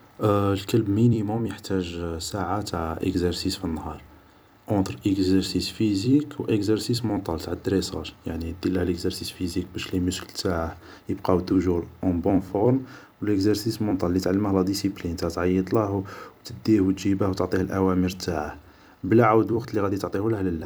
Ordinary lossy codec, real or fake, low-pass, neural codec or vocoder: none; fake; none; vocoder, 44.1 kHz, 128 mel bands every 256 samples, BigVGAN v2